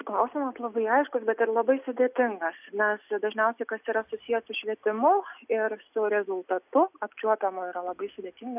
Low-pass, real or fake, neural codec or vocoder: 3.6 kHz; real; none